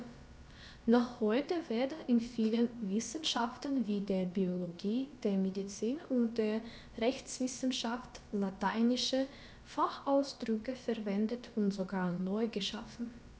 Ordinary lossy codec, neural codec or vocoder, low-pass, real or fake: none; codec, 16 kHz, about 1 kbps, DyCAST, with the encoder's durations; none; fake